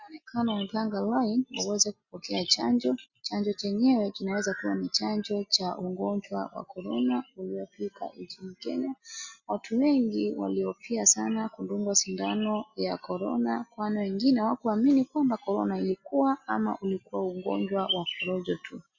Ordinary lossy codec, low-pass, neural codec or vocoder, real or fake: Opus, 64 kbps; 7.2 kHz; none; real